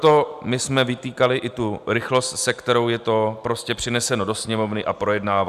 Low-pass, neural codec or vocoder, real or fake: 14.4 kHz; none; real